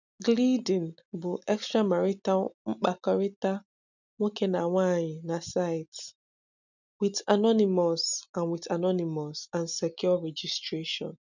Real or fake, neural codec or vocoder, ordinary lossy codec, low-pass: real; none; none; 7.2 kHz